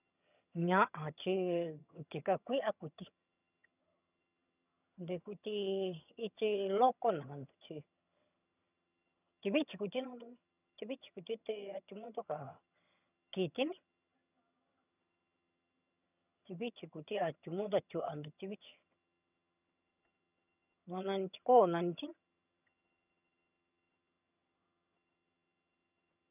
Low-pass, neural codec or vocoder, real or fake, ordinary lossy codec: 3.6 kHz; vocoder, 22.05 kHz, 80 mel bands, HiFi-GAN; fake; none